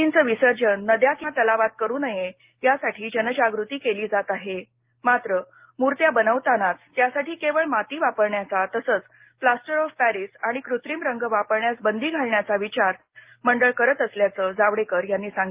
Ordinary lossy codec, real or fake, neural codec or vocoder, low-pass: Opus, 32 kbps; real; none; 3.6 kHz